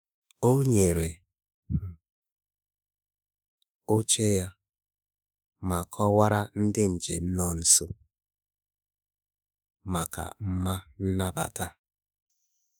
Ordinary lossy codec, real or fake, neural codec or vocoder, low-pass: none; fake; autoencoder, 48 kHz, 32 numbers a frame, DAC-VAE, trained on Japanese speech; none